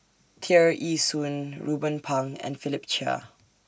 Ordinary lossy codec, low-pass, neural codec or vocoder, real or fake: none; none; none; real